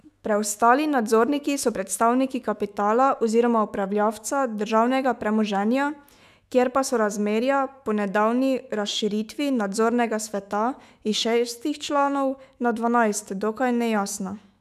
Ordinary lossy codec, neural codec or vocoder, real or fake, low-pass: none; autoencoder, 48 kHz, 128 numbers a frame, DAC-VAE, trained on Japanese speech; fake; 14.4 kHz